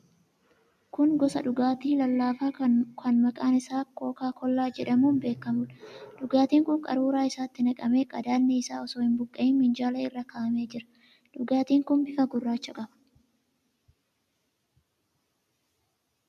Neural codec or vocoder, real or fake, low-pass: none; real; 14.4 kHz